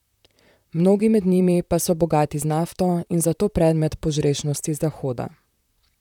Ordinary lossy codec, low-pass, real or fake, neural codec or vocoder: none; 19.8 kHz; fake; vocoder, 44.1 kHz, 128 mel bands, Pupu-Vocoder